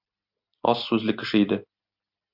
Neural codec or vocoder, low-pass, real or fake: none; 5.4 kHz; real